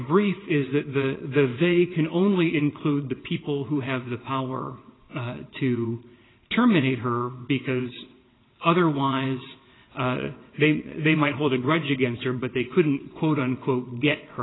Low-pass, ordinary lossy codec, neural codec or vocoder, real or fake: 7.2 kHz; AAC, 16 kbps; vocoder, 22.05 kHz, 80 mel bands, WaveNeXt; fake